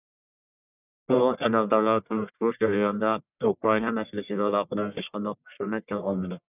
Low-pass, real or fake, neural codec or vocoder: 3.6 kHz; fake; codec, 44.1 kHz, 1.7 kbps, Pupu-Codec